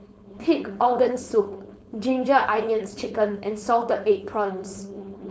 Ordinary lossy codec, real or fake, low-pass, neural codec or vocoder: none; fake; none; codec, 16 kHz, 4.8 kbps, FACodec